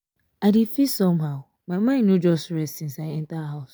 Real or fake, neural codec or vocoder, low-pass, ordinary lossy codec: real; none; none; none